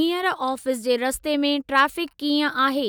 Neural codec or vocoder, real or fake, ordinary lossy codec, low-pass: none; real; none; none